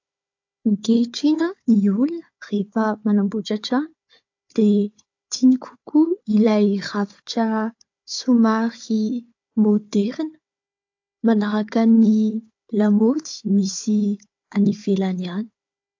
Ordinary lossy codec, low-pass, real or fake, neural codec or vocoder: AAC, 48 kbps; 7.2 kHz; fake; codec, 16 kHz, 4 kbps, FunCodec, trained on Chinese and English, 50 frames a second